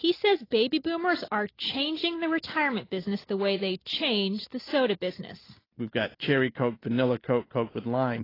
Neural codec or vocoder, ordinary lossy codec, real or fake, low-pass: none; AAC, 24 kbps; real; 5.4 kHz